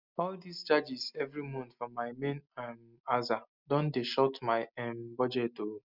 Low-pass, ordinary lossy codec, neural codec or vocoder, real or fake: 5.4 kHz; none; none; real